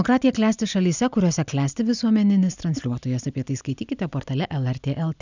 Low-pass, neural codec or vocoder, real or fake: 7.2 kHz; none; real